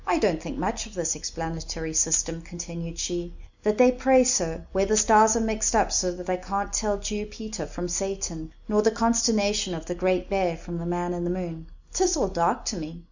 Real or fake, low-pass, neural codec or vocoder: real; 7.2 kHz; none